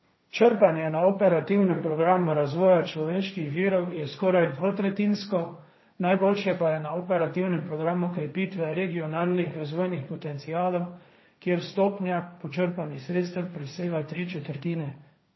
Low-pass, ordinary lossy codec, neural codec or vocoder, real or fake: 7.2 kHz; MP3, 24 kbps; codec, 16 kHz, 1.1 kbps, Voila-Tokenizer; fake